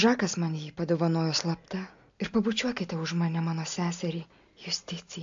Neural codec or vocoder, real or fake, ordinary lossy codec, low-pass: none; real; AAC, 64 kbps; 7.2 kHz